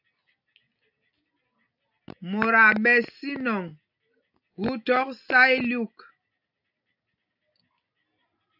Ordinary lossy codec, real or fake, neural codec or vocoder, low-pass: AAC, 48 kbps; real; none; 5.4 kHz